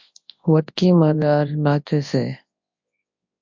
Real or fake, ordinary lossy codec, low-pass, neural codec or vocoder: fake; MP3, 48 kbps; 7.2 kHz; codec, 24 kHz, 0.9 kbps, WavTokenizer, large speech release